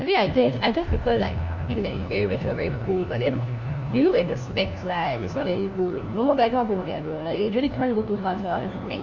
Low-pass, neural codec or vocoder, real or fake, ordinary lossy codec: 7.2 kHz; codec, 16 kHz, 1 kbps, FunCodec, trained on LibriTTS, 50 frames a second; fake; none